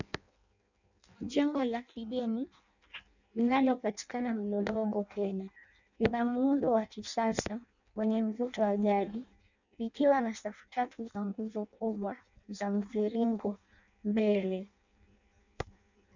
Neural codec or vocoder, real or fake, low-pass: codec, 16 kHz in and 24 kHz out, 0.6 kbps, FireRedTTS-2 codec; fake; 7.2 kHz